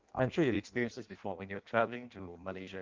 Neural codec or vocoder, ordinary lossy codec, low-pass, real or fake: codec, 16 kHz in and 24 kHz out, 0.6 kbps, FireRedTTS-2 codec; Opus, 32 kbps; 7.2 kHz; fake